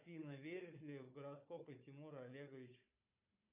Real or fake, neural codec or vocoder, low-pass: fake; codec, 16 kHz, 4 kbps, FunCodec, trained on Chinese and English, 50 frames a second; 3.6 kHz